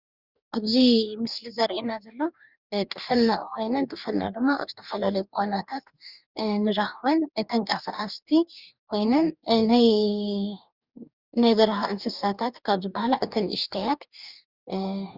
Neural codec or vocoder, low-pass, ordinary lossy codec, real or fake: codec, 44.1 kHz, 2.6 kbps, DAC; 5.4 kHz; Opus, 64 kbps; fake